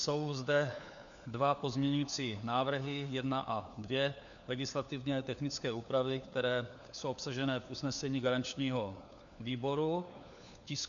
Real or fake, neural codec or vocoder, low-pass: fake; codec, 16 kHz, 4 kbps, FunCodec, trained on LibriTTS, 50 frames a second; 7.2 kHz